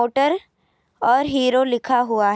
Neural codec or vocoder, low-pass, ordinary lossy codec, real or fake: none; none; none; real